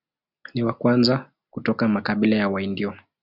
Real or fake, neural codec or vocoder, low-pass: real; none; 5.4 kHz